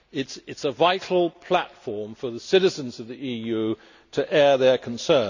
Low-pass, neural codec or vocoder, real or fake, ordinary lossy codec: 7.2 kHz; none; real; none